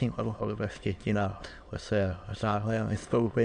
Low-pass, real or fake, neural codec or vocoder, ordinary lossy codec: 9.9 kHz; fake; autoencoder, 22.05 kHz, a latent of 192 numbers a frame, VITS, trained on many speakers; AAC, 64 kbps